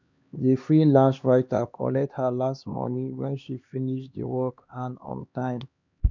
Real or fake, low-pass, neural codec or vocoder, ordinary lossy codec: fake; 7.2 kHz; codec, 16 kHz, 2 kbps, X-Codec, HuBERT features, trained on LibriSpeech; none